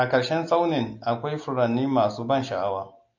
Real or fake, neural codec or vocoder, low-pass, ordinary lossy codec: real; none; 7.2 kHz; AAC, 48 kbps